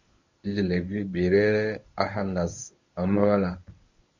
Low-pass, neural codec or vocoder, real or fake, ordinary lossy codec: 7.2 kHz; codec, 24 kHz, 0.9 kbps, WavTokenizer, medium speech release version 1; fake; Opus, 64 kbps